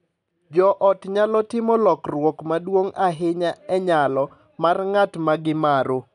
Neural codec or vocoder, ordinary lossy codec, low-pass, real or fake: none; none; 10.8 kHz; real